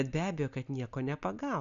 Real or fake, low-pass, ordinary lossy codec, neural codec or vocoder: real; 7.2 kHz; MP3, 96 kbps; none